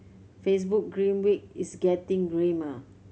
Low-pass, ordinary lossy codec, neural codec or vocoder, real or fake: none; none; none; real